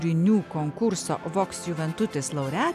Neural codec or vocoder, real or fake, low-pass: none; real; 14.4 kHz